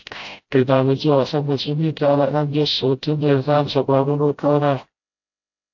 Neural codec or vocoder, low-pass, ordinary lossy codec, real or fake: codec, 16 kHz, 0.5 kbps, FreqCodec, smaller model; 7.2 kHz; AAC, 48 kbps; fake